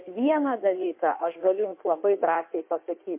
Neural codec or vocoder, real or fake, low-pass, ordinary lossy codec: codec, 16 kHz in and 24 kHz out, 1.1 kbps, FireRedTTS-2 codec; fake; 3.6 kHz; MP3, 24 kbps